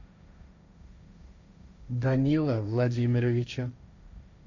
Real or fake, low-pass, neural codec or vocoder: fake; 7.2 kHz; codec, 16 kHz, 1.1 kbps, Voila-Tokenizer